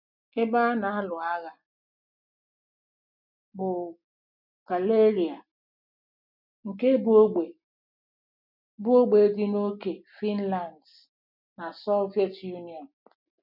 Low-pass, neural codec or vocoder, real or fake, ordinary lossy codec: 5.4 kHz; none; real; none